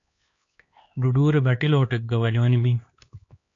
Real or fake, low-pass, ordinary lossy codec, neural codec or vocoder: fake; 7.2 kHz; AAC, 48 kbps; codec, 16 kHz, 2 kbps, X-Codec, HuBERT features, trained on LibriSpeech